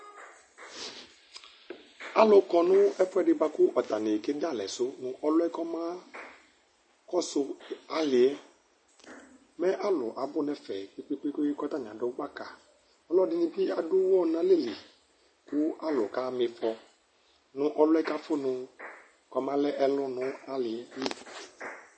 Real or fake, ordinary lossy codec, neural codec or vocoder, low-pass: real; MP3, 32 kbps; none; 9.9 kHz